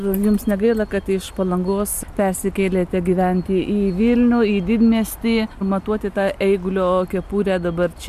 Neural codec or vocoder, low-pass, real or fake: none; 14.4 kHz; real